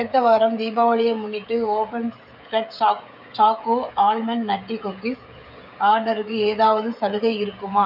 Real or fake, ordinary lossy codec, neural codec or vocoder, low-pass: fake; none; codec, 16 kHz, 16 kbps, FreqCodec, smaller model; 5.4 kHz